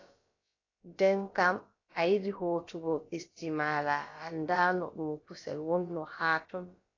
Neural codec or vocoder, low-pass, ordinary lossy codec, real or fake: codec, 16 kHz, about 1 kbps, DyCAST, with the encoder's durations; 7.2 kHz; AAC, 32 kbps; fake